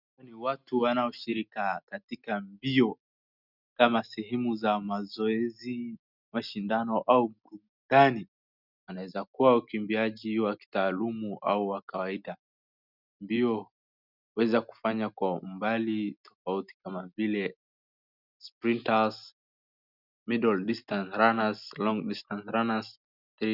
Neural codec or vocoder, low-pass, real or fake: none; 5.4 kHz; real